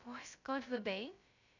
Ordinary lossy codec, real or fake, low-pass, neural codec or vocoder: none; fake; 7.2 kHz; codec, 16 kHz, 0.2 kbps, FocalCodec